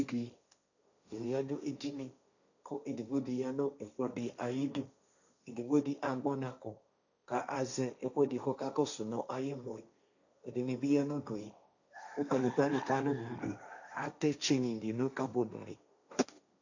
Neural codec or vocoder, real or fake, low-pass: codec, 16 kHz, 1.1 kbps, Voila-Tokenizer; fake; 7.2 kHz